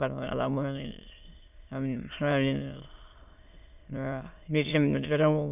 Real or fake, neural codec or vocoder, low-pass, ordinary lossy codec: fake; autoencoder, 22.05 kHz, a latent of 192 numbers a frame, VITS, trained on many speakers; 3.6 kHz; none